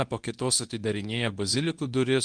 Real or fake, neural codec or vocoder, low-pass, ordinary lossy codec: fake; codec, 24 kHz, 0.9 kbps, WavTokenizer, medium speech release version 2; 9.9 kHz; Opus, 32 kbps